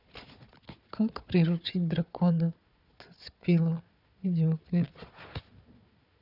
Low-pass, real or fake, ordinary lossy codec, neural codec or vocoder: 5.4 kHz; fake; none; codec, 16 kHz, 4 kbps, FunCodec, trained on Chinese and English, 50 frames a second